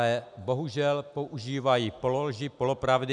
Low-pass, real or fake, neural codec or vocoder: 10.8 kHz; real; none